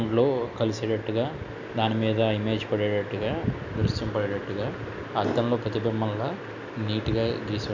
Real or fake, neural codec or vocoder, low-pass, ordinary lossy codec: real; none; 7.2 kHz; none